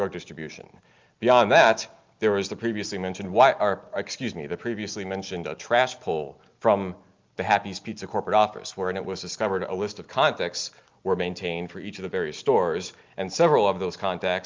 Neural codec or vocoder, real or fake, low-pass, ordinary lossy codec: none; real; 7.2 kHz; Opus, 24 kbps